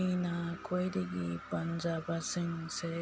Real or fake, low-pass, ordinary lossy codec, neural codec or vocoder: real; none; none; none